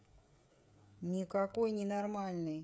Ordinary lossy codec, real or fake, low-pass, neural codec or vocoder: none; fake; none; codec, 16 kHz, 16 kbps, FreqCodec, smaller model